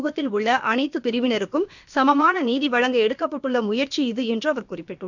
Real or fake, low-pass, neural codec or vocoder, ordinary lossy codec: fake; 7.2 kHz; codec, 16 kHz, about 1 kbps, DyCAST, with the encoder's durations; none